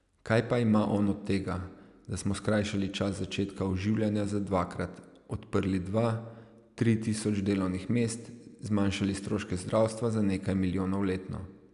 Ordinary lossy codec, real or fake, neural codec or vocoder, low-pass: none; real; none; 10.8 kHz